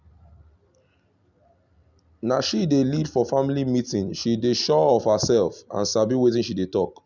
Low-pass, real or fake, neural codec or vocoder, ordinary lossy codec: 7.2 kHz; real; none; none